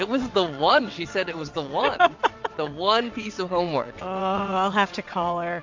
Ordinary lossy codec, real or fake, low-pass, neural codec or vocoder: MP3, 48 kbps; fake; 7.2 kHz; vocoder, 22.05 kHz, 80 mel bands, WaveNeXt